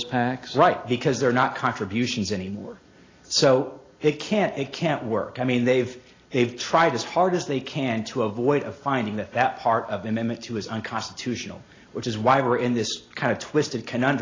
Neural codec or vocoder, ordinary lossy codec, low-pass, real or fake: none; AAC, 32 kbps; 7.2 kHz; real